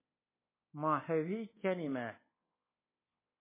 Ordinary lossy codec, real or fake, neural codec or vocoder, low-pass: MP3, 16 kbps; fake; codec, 24 kHz, 1.2 kbps, DualCodec; 3.6 kHz